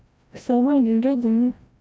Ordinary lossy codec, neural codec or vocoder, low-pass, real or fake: none; codec, 16 kHz, 0.5 kbps, FreqCodec, larger model; none; fake